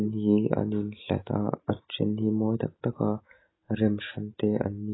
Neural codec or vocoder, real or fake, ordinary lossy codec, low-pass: none; real; AAC, 16 kbps; 7.2 kHz